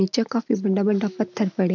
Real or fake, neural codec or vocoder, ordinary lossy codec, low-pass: real; none; none; 7.2 kHz